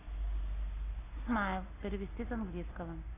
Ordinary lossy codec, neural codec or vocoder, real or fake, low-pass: AAC, 16 kbps; none; real; 3.6 kHz